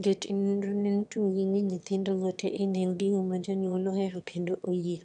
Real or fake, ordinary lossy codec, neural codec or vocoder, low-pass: fake; none; autoencoder, 22.05 kHz, a latent of 192 numbers a frame, VITS, trained on one speaker; 9.9 kHz